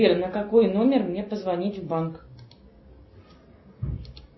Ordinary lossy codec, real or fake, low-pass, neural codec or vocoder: MP3, 24 kbps; real; 7.2 kHz; none